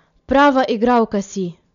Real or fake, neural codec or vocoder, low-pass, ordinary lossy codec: real; none; 7.2 kHz; MP3, 96 kbps